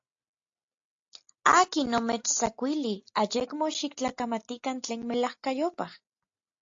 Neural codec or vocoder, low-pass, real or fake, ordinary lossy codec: none; 7.2 kHz; real; AAC, 48 kbps